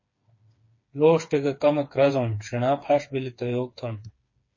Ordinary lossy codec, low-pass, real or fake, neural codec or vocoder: MP3, 32 kbps; 7.2 kHz; fake; codec, 16 kHz, 4 kbps, FreqCodec, smaller model